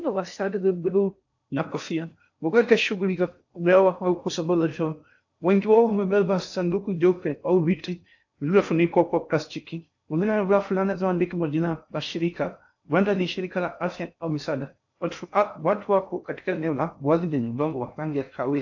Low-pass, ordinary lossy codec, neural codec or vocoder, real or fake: 7.2 kHz; AAC, 48 kbps; codec, 16 kHz in and 24 kHz out, 0.6 kbps, FocalCodec, streaming, 4096 codes; fake